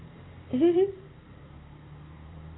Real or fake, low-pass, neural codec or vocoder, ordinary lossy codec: fake; 7.2 kHz; vocoder, 44.1 kHz, 128 mel bands every 512 samples, BigVGAN v2; AAC, 16 kbps